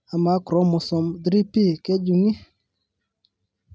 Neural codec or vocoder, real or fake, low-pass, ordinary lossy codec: none; real; none; none